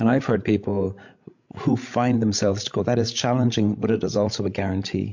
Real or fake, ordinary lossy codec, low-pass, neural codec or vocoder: fake; MP3, 48 kbps; 7.2 kHz; codec, 16 kHz, 16 kbps, FreqCodec, larger model